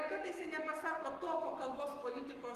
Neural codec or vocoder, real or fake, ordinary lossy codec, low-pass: codec, 44.1 kHz, 7.8 kbps, Pupu-Codec; fake; Opus, 24 kbps; 14.4 kHz